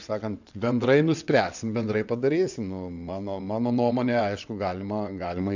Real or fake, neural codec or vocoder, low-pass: fake; vocoder, 22.05 kHz, 80 mel bands, WaveNeXt; 7.2 kHz